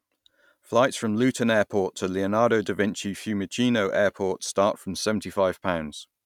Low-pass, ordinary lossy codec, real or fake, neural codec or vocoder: 19.8 kHz; none; real; none